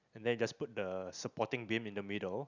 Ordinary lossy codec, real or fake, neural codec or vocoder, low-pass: none; real; none; 7.2 kHz